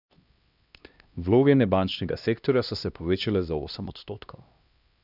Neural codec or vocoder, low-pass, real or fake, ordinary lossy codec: codec, 16 kHz, 1 kbps, X-Codec, HuBERT features, trained on LibriSpeech; 5.4 kHz; fake; none